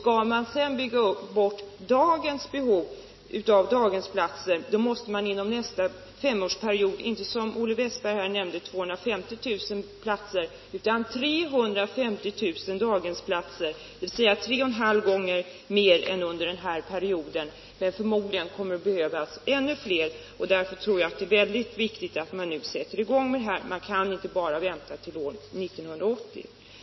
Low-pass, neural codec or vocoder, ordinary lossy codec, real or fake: 7.2 kHz; none; MP3, 24 kbps; real